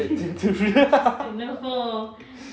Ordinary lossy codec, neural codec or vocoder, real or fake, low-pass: none; none; real; none